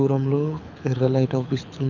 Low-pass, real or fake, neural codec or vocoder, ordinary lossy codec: 7.2 kHz; fake; codec, 24 kHz, 6 kbps, HILCodec; none